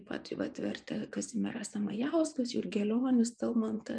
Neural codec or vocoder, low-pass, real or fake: vocoder, 24 kHz, 100 mel bands, Vocos; 9.9 kHz; fake